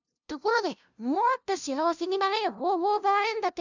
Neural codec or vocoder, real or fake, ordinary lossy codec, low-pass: codec, 16 kHz, 0.5 kbps, FunCodec, trained on LibriTTS, 25 frames a second; fake; none; 7.2 kHz